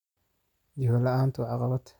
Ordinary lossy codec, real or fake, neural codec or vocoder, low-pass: none; real; none; 19.8 kHz